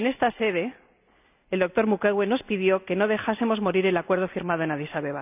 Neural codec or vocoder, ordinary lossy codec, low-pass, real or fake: none; none; 3.6 kHz; real